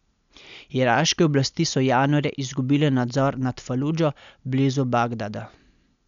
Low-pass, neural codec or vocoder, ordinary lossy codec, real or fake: 7.2 kHz; none; none; real